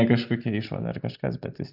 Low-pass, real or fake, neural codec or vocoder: 5.4 kHz; fake; vocoder, 44.1 kHz, 128 mel bands every 256 samples, BigVGAN v2